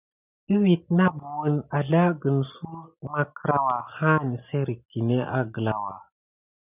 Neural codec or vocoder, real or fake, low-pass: none; real; 3.6 kHz